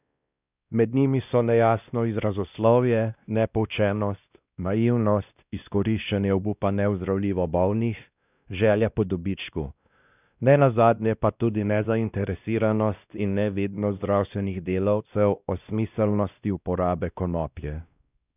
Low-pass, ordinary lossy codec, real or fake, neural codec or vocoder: 3.6 kHz; none; fake; codec, 16 kHz, 1 kbps, X-Codec, WavLM features, trained on Multilingual LibriSpeech